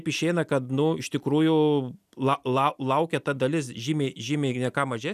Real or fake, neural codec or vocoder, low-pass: real; none; 14.4 kHz